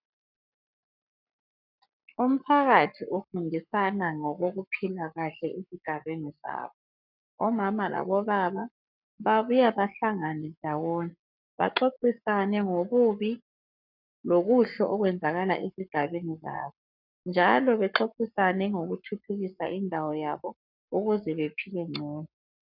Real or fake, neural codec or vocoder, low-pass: fake; codec, 44.1 kHz, 7.8 kbps, Pupu-Codec; 5.4 kHz